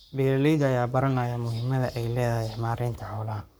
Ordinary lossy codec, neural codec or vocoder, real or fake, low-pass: none; codec, 44.1 kHz, 7.8 kbps, Pupu-Codec; fake; none